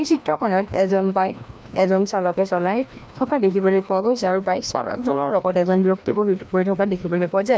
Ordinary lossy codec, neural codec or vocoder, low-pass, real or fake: none; codec, 16 kHz, 1 kbps, FreqCodec, larger model; none; fake